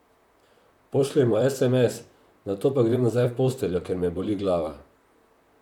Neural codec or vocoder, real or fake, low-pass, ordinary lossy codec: vocoder, 44.1 kHz, 128 mel bands, Pupu-Vocoder; fake; 19.8 kHz; none